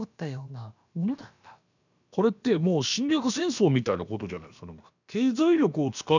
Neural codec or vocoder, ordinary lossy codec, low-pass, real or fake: codec, 16 kHz, about 1 kbps, DyCAST, with the encoder's durations; none; 7.2 kHz; fake